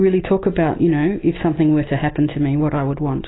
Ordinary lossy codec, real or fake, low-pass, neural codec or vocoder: AAC, 16 kbps; real; 7.2 kHz; none